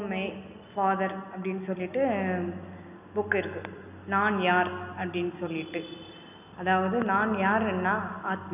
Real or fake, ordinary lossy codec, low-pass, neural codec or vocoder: real; none; 3.6 kHz; none